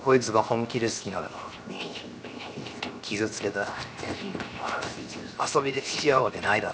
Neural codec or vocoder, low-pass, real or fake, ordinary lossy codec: codec, 16 kHz, 0.7 kbps, FocalCodec; none; fake; none